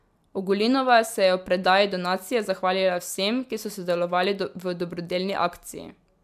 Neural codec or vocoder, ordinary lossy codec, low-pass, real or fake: none; MP3, 96 kbps; 14.4 kHz; real